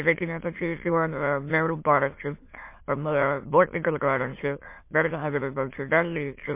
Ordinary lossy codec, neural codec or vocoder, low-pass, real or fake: MP3, 24 kbps; autoencoder, 22.05 kHz, a latent of 192 numbers a frame, VITS, trained on many speakers; 3.6 kHz; fake